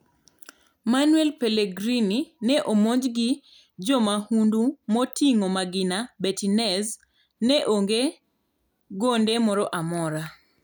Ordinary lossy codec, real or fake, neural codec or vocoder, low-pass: none; real; none; none